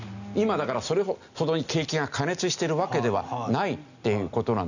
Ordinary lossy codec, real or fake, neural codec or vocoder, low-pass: none; real; none; 7.2 kHz